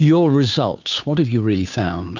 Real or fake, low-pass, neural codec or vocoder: fake; 7.2 kHz; codec, 16 kHz, 2 kbps, FunCodec, trained on Chinese and English, 25 frames a second